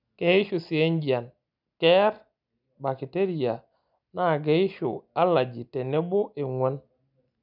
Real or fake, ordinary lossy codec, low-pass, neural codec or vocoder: real; none; 5.4 kHz; none